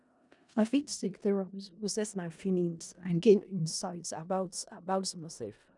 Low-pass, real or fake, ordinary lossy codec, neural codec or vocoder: 10.8 kHz; fake; none; codec, 16 kHz in and 24 kHz out, 0.4 kbps, LongCat-Audio-Codec, four codebook decoder